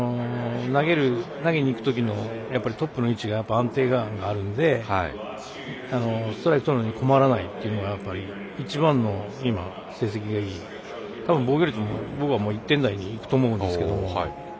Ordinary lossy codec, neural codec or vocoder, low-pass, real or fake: none; none; none; real